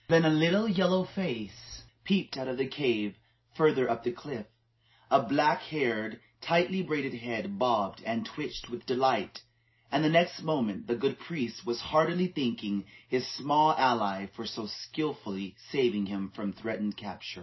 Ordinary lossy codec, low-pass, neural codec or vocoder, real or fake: MP3, 24 kbps; 7.2 kHz; none; real